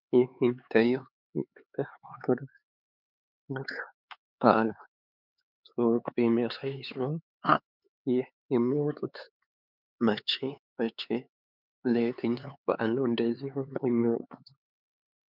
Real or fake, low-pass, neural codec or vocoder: fake; 5.4 kHz; codec, 16 kHz, 4 kbps, X-Codec, HuBERT features, trained on LibriSpeech